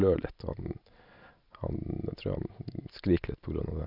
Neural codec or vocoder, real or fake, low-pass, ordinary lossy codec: none; real; 5.4 kHz; none